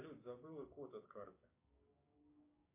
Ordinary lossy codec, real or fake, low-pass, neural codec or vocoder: AAC, 24 kbps; fake; 3.6 kHz; codec, 16 kHz, 6 kbps, DAC